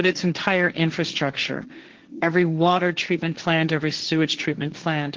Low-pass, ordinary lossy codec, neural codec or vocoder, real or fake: 7.2 kHz; Opus, 16 kbps; codec, 16 kHz, 1.1 kbps, Voila-Tokenizer; fake